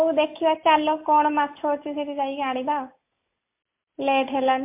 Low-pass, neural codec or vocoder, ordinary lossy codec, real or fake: 3.6 kHz; none; MP3, 24 kbps; real